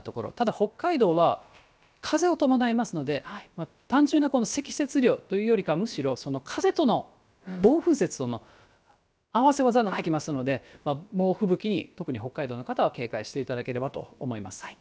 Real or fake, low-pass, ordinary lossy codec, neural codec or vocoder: fake; none; none; codec, 16 kHz, about 1 kbps, DyCAST, with the encoder's durations